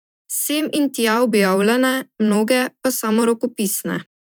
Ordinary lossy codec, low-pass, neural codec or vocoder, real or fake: none; none; vocoder, 44.1 kHz, 128 mel bands every 512 samples, BigVGAN v2; fake